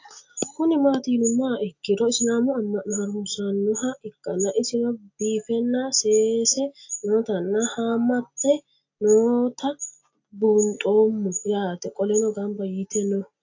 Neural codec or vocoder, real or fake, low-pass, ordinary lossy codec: none; real; 7.2 kHz; AAC, 48 kbps